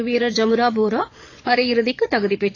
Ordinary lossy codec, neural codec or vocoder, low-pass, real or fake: AAC, 32 kbps; vocoder, 22.05 kHz, 80 mel bands, Vocos; 7.2 kHz; fake